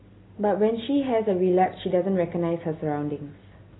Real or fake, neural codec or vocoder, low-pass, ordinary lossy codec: real; none; 7.2 kHz; AAC, 16 kbps